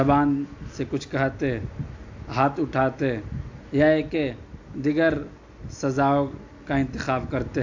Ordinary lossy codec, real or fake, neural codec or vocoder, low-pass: AAC, 48 kbps; real; none; 7.2 kHz